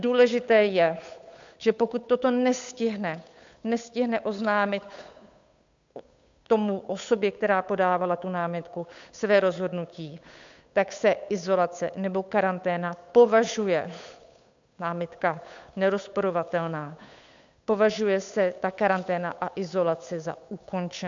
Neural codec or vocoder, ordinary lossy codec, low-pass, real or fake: codec, 16 kHz, 8 kbps, FunCodec, trained on Chinese and English, 25 frames a second; MP3, 64 kbps; 7.2 kHz; fake